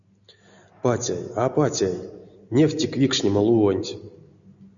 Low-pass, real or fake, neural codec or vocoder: 7.2 kHz; real; none